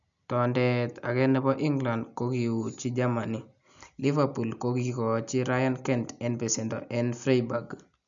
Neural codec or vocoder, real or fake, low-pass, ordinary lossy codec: none; real; 7.2 kHz; none